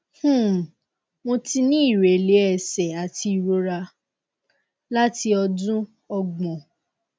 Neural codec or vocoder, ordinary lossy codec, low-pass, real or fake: none; none; none; real